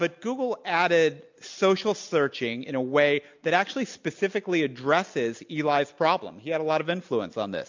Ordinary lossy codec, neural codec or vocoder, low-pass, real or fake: MP3, 64 kbps; none; 7.2 kHz; real